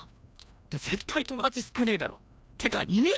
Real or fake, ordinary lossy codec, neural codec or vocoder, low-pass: fake; none; codec, 16 kHz, 1 kbps, FreqCodec, larger model; none